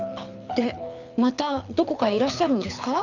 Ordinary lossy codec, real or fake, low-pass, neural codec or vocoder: none; fake; 7.2 kHz; codec, 24 kHz, 6 kbps, HILCodec